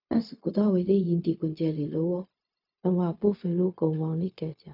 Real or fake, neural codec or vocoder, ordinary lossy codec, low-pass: fake; codec, 16 kHz, 0.4 kbps, LongCat-Audio-Codec; none; 5.4 kHz